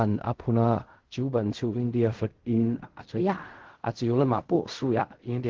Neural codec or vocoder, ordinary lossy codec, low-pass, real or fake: codec, 16 kHz in and 24 kHz out, 0.4 kbps, LongCat-Audio-Codec, fine tuned four codebook decoder; Opus, 16 kbps; 7.2 kHz; fake